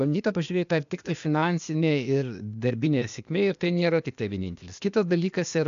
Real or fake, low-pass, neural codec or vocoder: fake; 7.2 kHz; codec, 16 kHz, 0.8 kbps, ZipCodec